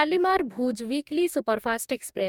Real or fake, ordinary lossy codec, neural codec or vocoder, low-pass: fake; MP3, 96 kbps; codec, 44.1 kHz, 2.6 kbps, DAC; 19.8 kHz